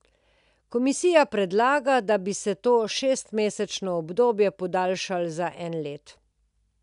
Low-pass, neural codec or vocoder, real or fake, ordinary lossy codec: 10.8 kHz; none; real; none